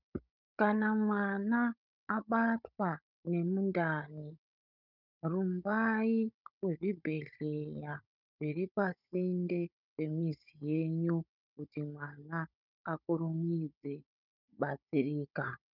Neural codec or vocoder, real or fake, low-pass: codec, 16 kHz, 16 kbps, FunCodec, trained on Chinese and English, 50 frames a second; fake; 5.4 kHz